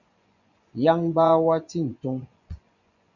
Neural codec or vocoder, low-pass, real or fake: vocoder, 24 kHz, 100 mel bands, Vocos; 7.2 kHz; fake